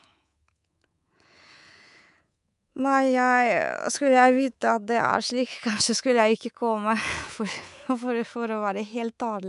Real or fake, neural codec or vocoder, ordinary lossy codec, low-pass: fake; codec, 24 kHz, 3.1 kbps, DualCodec; none; 10.8 kHz